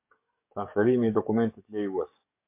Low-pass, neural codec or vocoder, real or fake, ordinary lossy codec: 3.6 kHz; codec, 44.1 kHz, 7.8 kbps, DAC; fake; MP3, 32 kbps